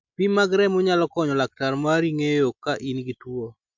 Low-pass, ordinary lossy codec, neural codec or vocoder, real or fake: 7.2 kHz; MP3, 64 kbps; none; real